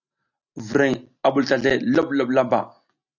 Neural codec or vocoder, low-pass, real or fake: none; 7.2 kHz; real